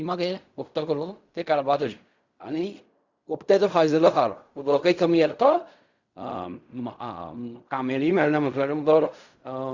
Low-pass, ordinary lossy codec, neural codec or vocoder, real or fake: 7.2 kHz; Opus, 64 kbps; codec, 16 kHz in and 24 kHz out, 0.4 kbps, LongCat-Audio-Codec, fine tuned four codebook decoder; fake